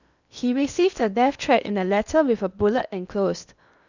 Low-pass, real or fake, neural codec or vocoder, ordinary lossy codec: 7.2 kHz; fake; codec, 16 kHz in and 24 kHz out, 0.8 kbps, FocalCodec, streaming, 65536 codes; none